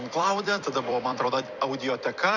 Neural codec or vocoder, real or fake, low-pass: vocoder, 44.1 kHz, 128 mel bands every 256 samples, BigVGAN v2; fake; 7.2 kHz